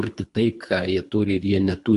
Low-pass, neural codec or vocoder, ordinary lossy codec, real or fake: 10.8 kHz; codec, 24 kHz, 3 kbps, HILCodec; AAC, 64 kbps; fake